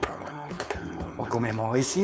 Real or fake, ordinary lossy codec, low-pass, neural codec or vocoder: fake; none; none; codec, 16 kHz, 4.8 kbps, FACodec